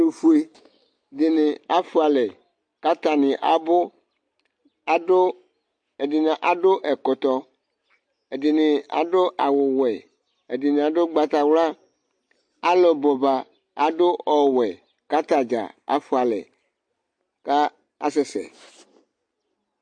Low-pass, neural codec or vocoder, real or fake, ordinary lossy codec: 9.9 kHz; none; real; MP3, 48 kbps